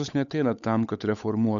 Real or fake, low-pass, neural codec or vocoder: fake; 7.2 kHz; codec, 16 kHz, 8 kbps, FunCodec, trained on Chinese and English, 25 frames a second